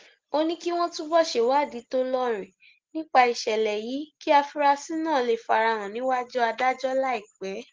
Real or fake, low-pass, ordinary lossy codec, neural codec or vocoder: real; 7.2 kHz; Opus, 16 kbps; none